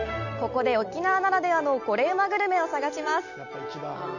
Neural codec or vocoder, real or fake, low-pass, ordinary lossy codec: none; real; 7.2 kHz; none